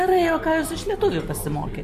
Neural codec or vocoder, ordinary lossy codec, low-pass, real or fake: none; MP3, 64 kbps; 14.4 kHz; real